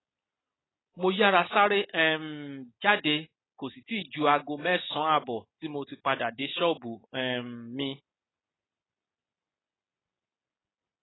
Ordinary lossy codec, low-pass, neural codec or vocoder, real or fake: AAC, 16 kbps; 7.2 kHz; none; real